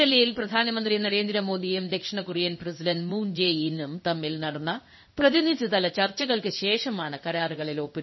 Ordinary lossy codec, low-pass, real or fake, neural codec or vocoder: MP3, 24 kbps; 7.2 kHz; fake; codec, 16 kHz in and 24 kHz out, 1 kbps, XY-Tokenizer